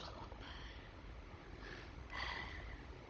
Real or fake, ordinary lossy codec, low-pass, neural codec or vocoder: fake; none; none; codec, 16 kHz, 16 kbps, FunCodec, trained on Chinese and English, 50 frames a second